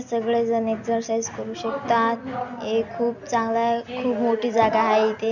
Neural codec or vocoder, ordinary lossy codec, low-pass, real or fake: none; none; 7.2 kHz; real